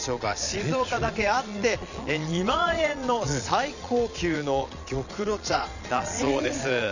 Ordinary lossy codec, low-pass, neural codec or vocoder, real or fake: none; 7.2 kHz; vocoder, 22.05 kHz, 80 mel bands, Vocos; fake